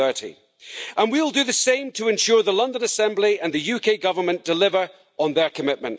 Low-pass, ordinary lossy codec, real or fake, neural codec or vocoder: none; none; real; none